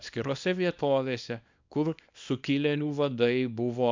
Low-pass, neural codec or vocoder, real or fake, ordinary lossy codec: 7.2 kHz; codec, 24 kHz, 0.9 kbps, WavTokenizer, small release; fake; MP3, 64 kbps